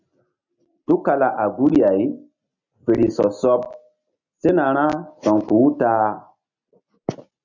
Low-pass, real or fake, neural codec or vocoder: 7.2 kHz; real; none